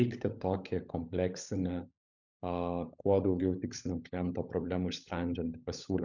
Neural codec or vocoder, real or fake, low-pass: codec, 16 kHz, 16 kbps, FunCodec, trained on LibriTTS, 50 frames a second; fake; 7.2 kHz